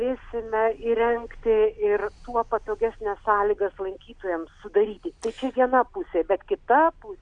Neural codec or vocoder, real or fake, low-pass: none; real; 9.9 kHz